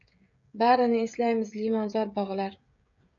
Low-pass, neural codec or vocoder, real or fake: 7.2 kHz; codec, 16 kHz, 16 kbps, FreqCodec, smaller model; fake